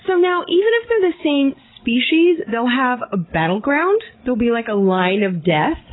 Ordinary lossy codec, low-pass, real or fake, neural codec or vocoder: AAC, 16 kbps; 7.2 kHz; fake; codec, 16 kHz, 16 kbps, FreqCodec, larger model